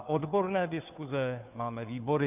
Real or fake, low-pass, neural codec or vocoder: fake; 3.6 kHz; codec, 16 kHz, 2 kbps, FunCodec, trained on Chinese and English, 25 frames a second